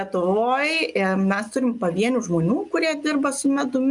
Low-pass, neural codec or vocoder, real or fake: 10.8 kHz; none; real